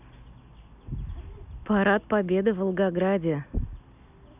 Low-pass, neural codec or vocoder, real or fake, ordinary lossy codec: 3.6 kHz; none; real; none